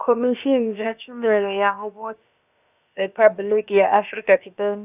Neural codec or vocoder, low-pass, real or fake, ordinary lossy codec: codec, 16 kHz, about 1 kbps, DyCAST, with the encoder's durations; 3.6 kHz; fake; none